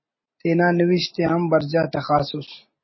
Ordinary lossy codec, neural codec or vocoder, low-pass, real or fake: MP3, 24 kbps; none; 7.2 kHz; real